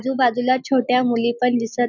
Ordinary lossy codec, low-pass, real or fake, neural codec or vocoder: none; 7.2 kHz; real; none